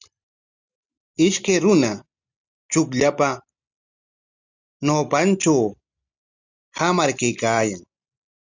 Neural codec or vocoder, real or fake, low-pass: none; real; 7.2 kHz